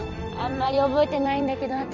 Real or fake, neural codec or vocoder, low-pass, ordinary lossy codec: fake; vocoder, 44.1 kHz, 128 mel bands every 256 samples, BigVGAN v2; 7.2 kHz; none